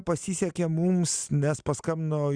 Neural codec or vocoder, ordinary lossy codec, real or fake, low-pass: none; Opus, 64 kbps; real; 9.9 kHz